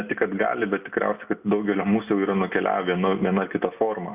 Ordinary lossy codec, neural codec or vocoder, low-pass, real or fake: Opus, 64 kbps; none; 3.6 kHz; real